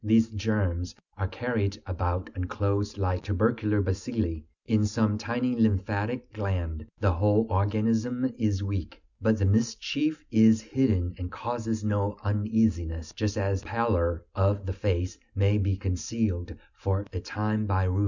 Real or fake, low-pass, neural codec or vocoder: real; 7.2 kHz; none